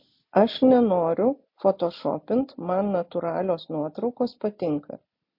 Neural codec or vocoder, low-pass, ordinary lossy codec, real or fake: none; 5.4 kHz; MP3, 32 kbps; real